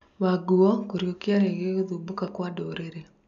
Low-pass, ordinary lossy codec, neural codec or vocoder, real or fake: 7.2 kHz; none; none; real